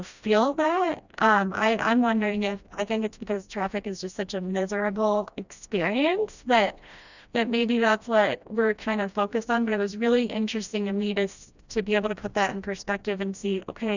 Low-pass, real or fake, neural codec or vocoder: 7.2 kHz; fake; codec, 16 kHz, 1 kbps, FreqCodec, smaller model